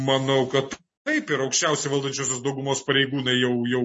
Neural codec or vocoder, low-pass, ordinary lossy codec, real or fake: none; 10.8 kHz; MP3, 32 kbps; real